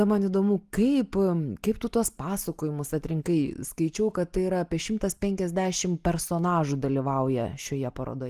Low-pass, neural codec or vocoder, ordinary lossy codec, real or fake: 14.4 kHz; none; Opus, 24 kbps; real